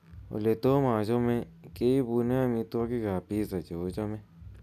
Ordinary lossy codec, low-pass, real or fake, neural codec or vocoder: none; 14.4 kHz; real; none